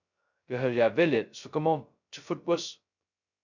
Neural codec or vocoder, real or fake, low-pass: codec, 16 kHz, 0.2 kbps, FocalCodec; fake; 7.2 kHz